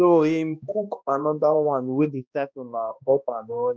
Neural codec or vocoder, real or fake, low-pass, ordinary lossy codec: codec, 16 kHz, 1 kbps, X-Codec, HuBERT features, trained on balanced general audio; fake; none; none